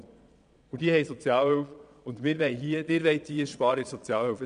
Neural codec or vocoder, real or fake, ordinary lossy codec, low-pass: vocoder, 22.05 kHz, 80 mel bands, Vocos; fake; none; 9.9 kHz